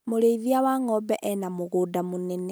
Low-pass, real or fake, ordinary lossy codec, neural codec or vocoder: none; real; none; none